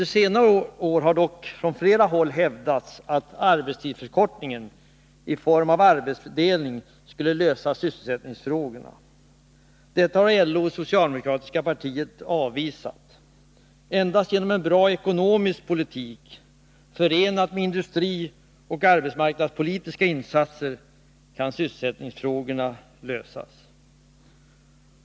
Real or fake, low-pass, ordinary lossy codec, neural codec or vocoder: real; none; none; none